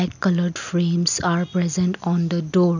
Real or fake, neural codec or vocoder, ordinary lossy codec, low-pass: real; none; none; 7.2 kHz